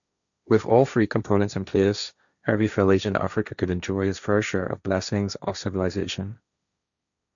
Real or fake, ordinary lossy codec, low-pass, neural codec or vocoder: fake; none; 7.2 kHz; codec, 16 kHz, 1.1 kbps, Voila-Tokenizer